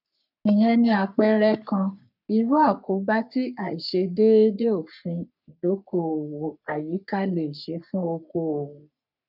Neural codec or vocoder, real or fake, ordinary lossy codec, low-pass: codec, 44.1 kHz, 3.4 kbps, Pupu-Codec; fake; none; 5.4 kHz